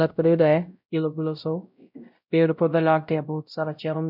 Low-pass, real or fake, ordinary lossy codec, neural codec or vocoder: 5.4 kHz; fake; none; codec, 16 kHz, 0.5 kbps, X-Codec, WavLM features, trained on Multilingual LibriSpeech